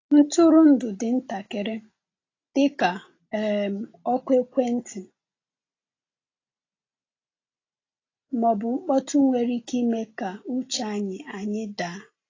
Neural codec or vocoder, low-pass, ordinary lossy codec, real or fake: none; 7.2 kHz; AAC, 32 kbps; real